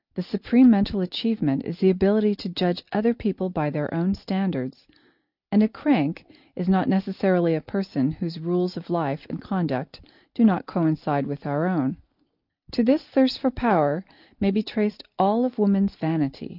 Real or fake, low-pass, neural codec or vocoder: real; 5.4 kHz; none